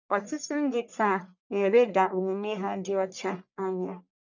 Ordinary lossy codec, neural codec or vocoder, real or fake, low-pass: none; codec, 44.1 kHz, 1.7 kbps, Pupu-Codec; fake; 7.2 kHz